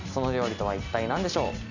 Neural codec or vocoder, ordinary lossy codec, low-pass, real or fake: none; none; 7.2 kHz; real